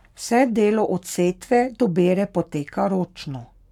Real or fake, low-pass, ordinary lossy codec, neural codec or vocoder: fake; 19.8 kHz; none; codec, 44.1 kHz, 7.8 kbps, Pupu-Codec